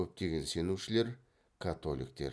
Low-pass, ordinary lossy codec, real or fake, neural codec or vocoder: none; none; real; none